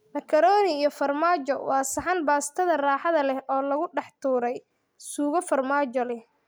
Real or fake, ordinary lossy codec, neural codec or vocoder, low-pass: real; none; none; none